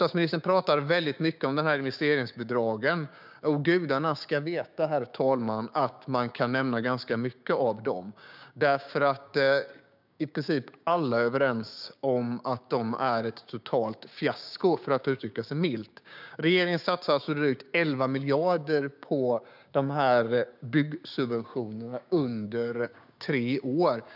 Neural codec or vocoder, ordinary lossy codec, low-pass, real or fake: codec, 16 kHz, 6 kbps, DAC; none; 5.4 kHz; fake